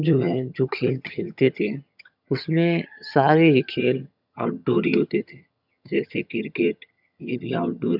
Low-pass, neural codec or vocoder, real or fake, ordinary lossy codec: 5.4 kHz; vocoder, 22.05 kHz, 80 mel bands, HiFi-GAN; fake; none